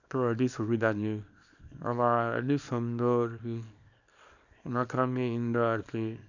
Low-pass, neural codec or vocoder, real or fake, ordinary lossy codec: 7.2 kHz; codec, 24 kHz, 0.9 kbps, WavTokenizer, small release; fake; none